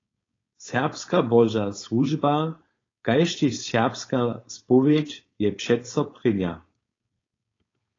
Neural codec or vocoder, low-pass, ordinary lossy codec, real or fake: codec, 16 kHz, 4.8 kbps, FACodec; 7.2 kHz; AAC, 32 kbps; fake